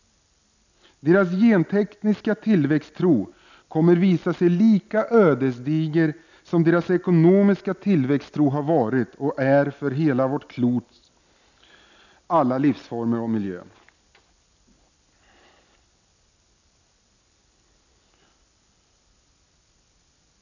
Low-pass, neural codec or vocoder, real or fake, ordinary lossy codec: 7.2 kHz; none; real; none